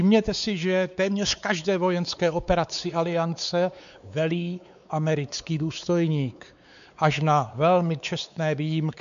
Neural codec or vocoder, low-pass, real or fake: codec, 16 kHz, 4 kbps, X-Codec, WavLM features, trained on Multilingual LibriSpeech; 7.2 kHz; fake